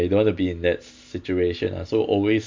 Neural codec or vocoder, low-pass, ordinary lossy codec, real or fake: none; 7.2 kHz; MP3, 64 kbps; real